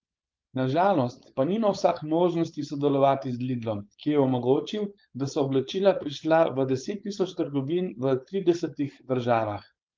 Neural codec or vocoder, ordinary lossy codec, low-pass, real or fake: codec, 16 kHz, 4.8 kbps, FACodec; Opus, 32 kbps; 7.2 kHz; fake